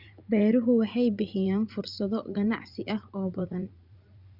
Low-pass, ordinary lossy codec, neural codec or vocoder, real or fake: 5.4 kHz; Opus, 64 kbps; vocoder, 44.1 kHz, 80 mel bands, Vocos; fake